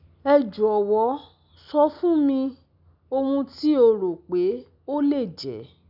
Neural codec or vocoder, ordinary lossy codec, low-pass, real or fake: none; none; 5.4 kHz; real